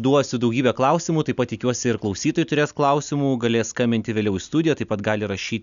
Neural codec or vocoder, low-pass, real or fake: none; 7.2 kHz; real